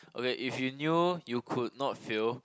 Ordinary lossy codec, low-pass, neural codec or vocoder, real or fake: none; none; none; real